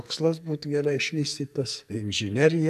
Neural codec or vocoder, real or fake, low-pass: codec, 44.1 kHz, 2.6 kbps, SNAC; fake; 14.4 kHz